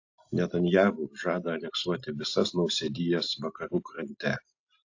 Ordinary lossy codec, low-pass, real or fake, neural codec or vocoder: AAC, 48 kbps; 7.2 kHz; real; none